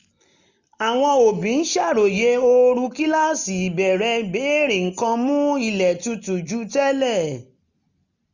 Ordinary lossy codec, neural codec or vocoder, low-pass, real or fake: none; none; 7.2 kHz; real